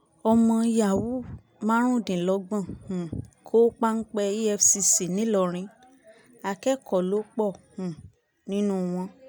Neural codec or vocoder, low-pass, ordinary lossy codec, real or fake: none; none; none; real